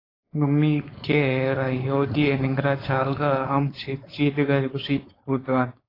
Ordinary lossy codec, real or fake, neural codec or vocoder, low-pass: AAC, 24 kbps; fake; codec, 16 kHz, 4.8 kbps, FACodec; 5.4 kHz